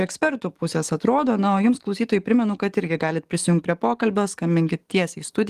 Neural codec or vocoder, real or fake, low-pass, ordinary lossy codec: codec, 44.1 kHz, 7.8 kbps, DAC; fake; 14.4 kHz; Opus, 24 kbps